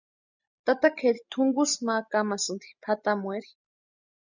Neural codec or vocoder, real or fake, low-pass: none; real; 7.2 kHz